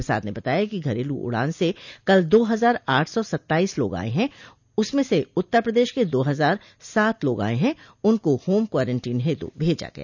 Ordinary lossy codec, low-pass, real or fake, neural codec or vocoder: none; 7.2 kHz; real; none